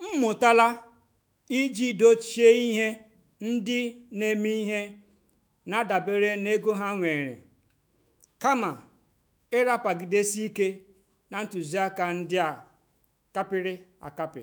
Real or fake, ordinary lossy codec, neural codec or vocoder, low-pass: fake; none; autoencoder, 48 kHz, 128 numbers a frame, DAC-VAE, trained on Japanese speech; none